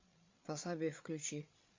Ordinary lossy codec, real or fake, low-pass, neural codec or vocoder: MP3, 64 kbps; fake; 7.2 kHz; codec, 16 kHz, 8 kbps, FreqCodec, larger model